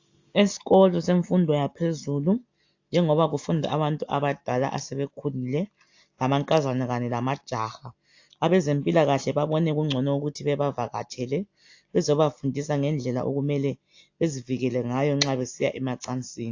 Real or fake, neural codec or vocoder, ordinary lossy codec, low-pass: real; none; AAC, 48 kbps; 7.2 kHz